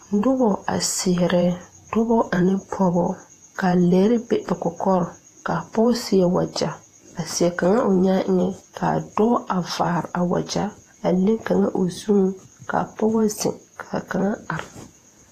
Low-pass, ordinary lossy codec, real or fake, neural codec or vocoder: 14.4 kHz; AAC, 48 kbps; fake; vocoder, 48 kHz, 128 mel bands, Vocos